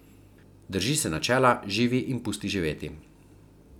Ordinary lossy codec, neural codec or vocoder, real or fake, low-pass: none; none; real; 19.8 kHz